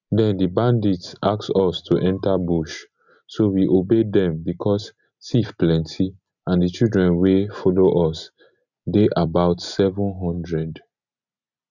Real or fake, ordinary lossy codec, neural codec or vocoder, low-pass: real; none; none; 7.2 kHz